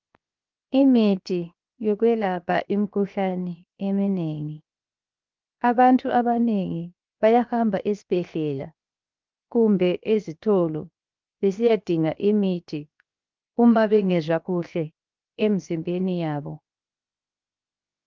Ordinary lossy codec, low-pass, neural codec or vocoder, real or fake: Opus, 24 kbps; 7.2 kHz; codec, 16 kHz, 0.7 kbps, FocalCodec; fake